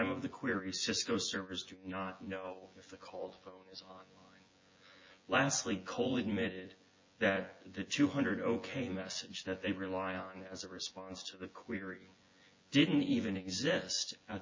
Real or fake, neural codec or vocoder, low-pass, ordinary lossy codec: fake; vocoder, 24 kHz, 100 mel bands, Vocos; 7.2 kHz; MP3, 32 kbps